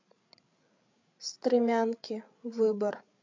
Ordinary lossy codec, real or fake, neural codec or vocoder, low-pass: MP3, 48 kbps; fake; codec, 16 kHz, 8 kbps, FreqCodec, larger model; 7.2 kHz